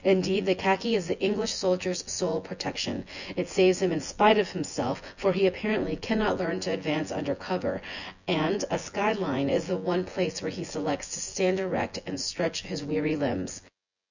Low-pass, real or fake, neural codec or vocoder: 7.2 kHz; fake; vocoder, 24 kHz, 100 mel bands, Vocos